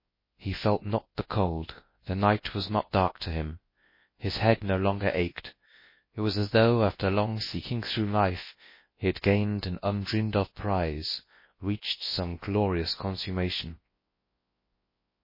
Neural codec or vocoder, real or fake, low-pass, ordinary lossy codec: codec, 24 kHz, 0.9 kbps, WavTokenizer, large speech release; fake; 5.4 kHz; MP3, 24 kbps